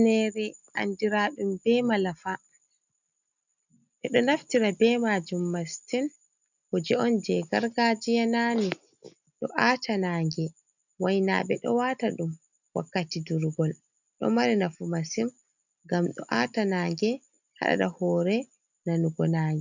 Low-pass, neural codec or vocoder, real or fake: 7.2 kHz; none; real